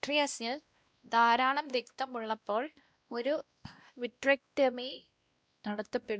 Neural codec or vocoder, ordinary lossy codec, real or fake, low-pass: codec, 16 kHz, 1 kbps, X-Codec, WavLM features, trained on Multilingual LibriSpeech; none; fake; none